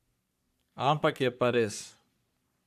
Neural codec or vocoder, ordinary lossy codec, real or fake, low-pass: codec, 44.1 kHz, 3.4 kbps, Pupu-Codec; none; fake; 14.4 kHz